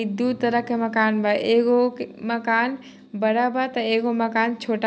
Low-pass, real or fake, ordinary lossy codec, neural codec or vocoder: none; real; none; none